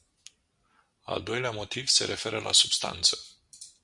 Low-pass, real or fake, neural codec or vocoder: 10.8 kHz; real; none